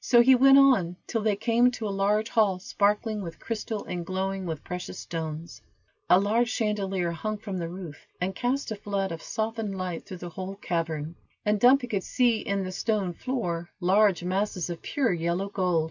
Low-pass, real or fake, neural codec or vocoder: 7.2 kHz; real; none